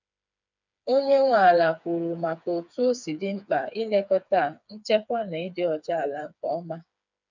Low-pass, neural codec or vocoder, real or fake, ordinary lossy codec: 7.2 kHz; codec, 16 kHz, 4 kbps, FreqCodec, smaller model; fake; none